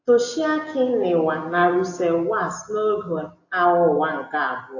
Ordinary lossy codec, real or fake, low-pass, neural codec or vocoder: AAC, 48 kbps; real; 7.2 kHz; none